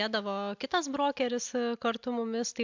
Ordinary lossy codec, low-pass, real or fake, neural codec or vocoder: MP3, 64 kbps; 7.2 kHz; real; none